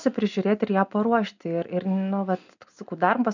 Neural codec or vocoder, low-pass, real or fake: none; 7.2 kHz; real